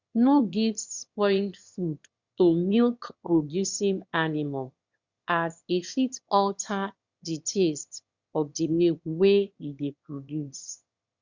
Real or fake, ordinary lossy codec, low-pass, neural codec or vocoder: fake; Opus, 64 kbps; 7.2 kHz; autoencoder, 22.05 kHz, a latent of 192 numbers a frame, VITS, trained on one speaker